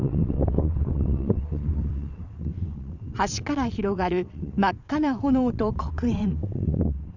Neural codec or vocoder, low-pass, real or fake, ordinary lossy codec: vocoder, 22.05 kHz, 80 mel bands, WaveNeXt; 7.2 kHz; fake; none